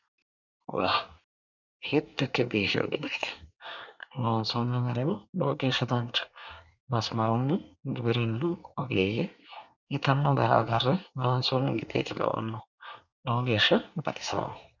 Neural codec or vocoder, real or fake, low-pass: codec, 24 kHz, 1 kbps, SNAC; fake; 7.2 kHz